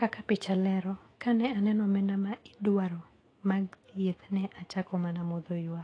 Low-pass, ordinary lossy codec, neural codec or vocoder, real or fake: 9.9 kHz; AAC, 32 kbps; autoencoder, 48 kHz, 128 numbers a frame, DAC-VAE, trained on Japanese speech; fake